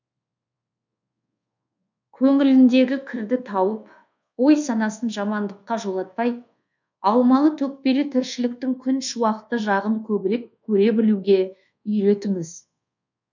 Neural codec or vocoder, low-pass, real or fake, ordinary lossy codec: codec, 24 kHz, 1.2 kbps, DualCodec; 7.2 kHz; fake; none